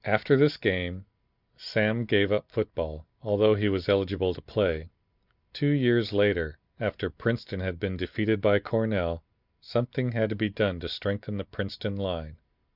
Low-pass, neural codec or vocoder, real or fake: 5.4 kHz; none; real